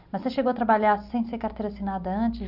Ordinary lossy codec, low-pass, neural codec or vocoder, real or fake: Opus, 64 kbps; 5.4 kHz; none; real